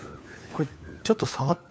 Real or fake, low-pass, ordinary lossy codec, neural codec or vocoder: fake; none; none; codec, 16 kHz, 4 kbps, FunCodec, trained on LibriTTS, 50 frames a second